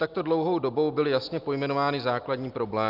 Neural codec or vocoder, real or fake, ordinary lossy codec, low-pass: none; real; Opus, 24 kbps; 5.4 kHz